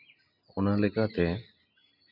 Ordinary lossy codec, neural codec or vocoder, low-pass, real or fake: none; none; 5.4 kHz; real